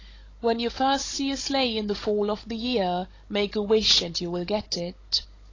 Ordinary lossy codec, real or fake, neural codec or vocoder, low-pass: AAC, 32 kbps; fake; codec, 16 kHz, 16 kbps, FunCodec, trained on Chinese and English, 50 frames a second; 7.2 kHz